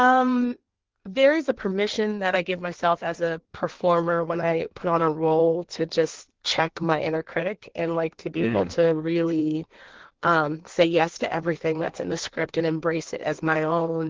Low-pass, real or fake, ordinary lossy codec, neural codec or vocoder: 7.2 kHz; fake; Opus, 16 kbps; codec, 16 kHz in and 24 kHz out, 1.1 kbps, FireRedTTS-2 codec